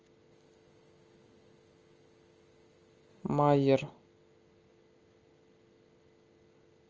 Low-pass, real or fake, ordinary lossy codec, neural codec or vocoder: 7.2 kHz; real; Opus, 24 kbps; none